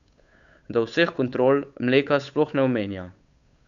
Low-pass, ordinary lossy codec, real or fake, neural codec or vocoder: 7.2 kHz; none; fake; codec, 16 kHz, 8 kbps, FunCodec, trained on Chinese and English, 25 frames a second